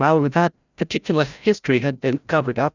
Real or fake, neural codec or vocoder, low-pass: fake; codec, 16 kHz, 0.5 kbps, FreqCodec, larger model; 7.2 kHz